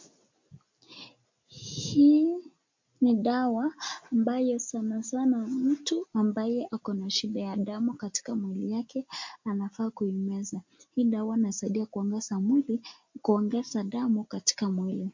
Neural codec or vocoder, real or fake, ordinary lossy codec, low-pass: none; real; MP3, 48 kbps; 7.2 kHz